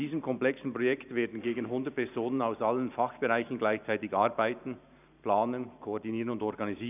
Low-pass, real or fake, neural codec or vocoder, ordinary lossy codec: 3.6 kHz; fake; vocoder, 44.1 kHz, 128 mel bands every 512 samples, BigVGAN v2; none